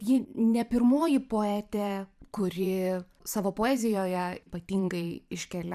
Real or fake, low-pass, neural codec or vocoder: fake; 14.4 kHz; vocoder, 44.1 kHz, 128 mel bands every 512 samples, BigVGAN v2